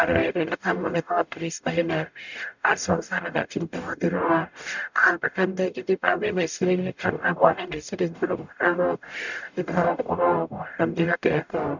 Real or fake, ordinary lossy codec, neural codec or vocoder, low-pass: fake; none; codec, 44.1 kHz, 0.9 kbps, DAC; 7.2 kHz